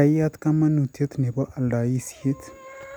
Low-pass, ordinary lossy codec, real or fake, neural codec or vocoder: none; none; real; none